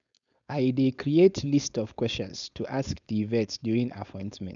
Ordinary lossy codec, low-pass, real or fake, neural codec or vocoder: none; 7.2 kHz; fake; codec, 16 kHz, 4.8 kbps, FACodec